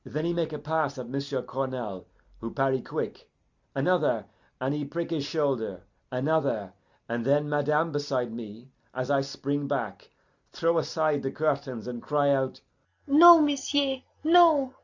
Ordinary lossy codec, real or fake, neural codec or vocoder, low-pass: Opus, 64 kbps; real; none; 7.2 kHz